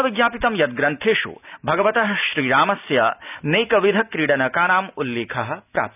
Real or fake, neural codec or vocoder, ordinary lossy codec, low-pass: real; none; none; 3.6 kHz